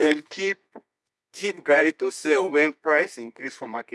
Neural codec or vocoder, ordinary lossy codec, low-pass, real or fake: codec, 24 kHz, 0.9 kbps, WavTokenizer, medium music audio release; none; none; fake